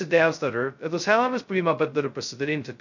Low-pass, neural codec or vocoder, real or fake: 7.2 kHz; codec, 16 kHz, 0.2 kbps, FocalCodec; fake